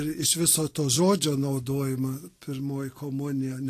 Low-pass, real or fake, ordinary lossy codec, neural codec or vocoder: 14.4 kHz; real; AAC, 48 kbps; none